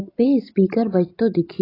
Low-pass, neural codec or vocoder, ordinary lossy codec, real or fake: 5.4 kHz; none; AAC, 24 kbps; real